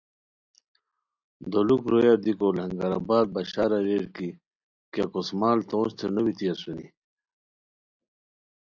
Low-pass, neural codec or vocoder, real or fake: 7.2 kHz; none; real